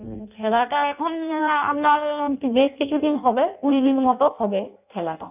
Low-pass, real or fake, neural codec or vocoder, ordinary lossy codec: 3.6 kHz; fake; codec, 16 kHz in and 24 kHz out, 0.6 kbps, FireRedTTS-2 codec; none